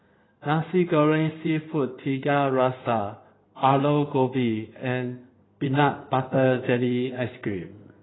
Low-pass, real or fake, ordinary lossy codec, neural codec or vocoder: 7.2 kHz; fake; AAC, 16 kbps; codec, 16 kHz in and 24 kHz out, 2.2 kbps, FireRedTTS-2 codec